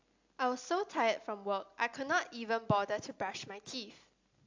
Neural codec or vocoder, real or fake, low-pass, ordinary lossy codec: none; real; 7.2 kHz; AAC, 48 kbps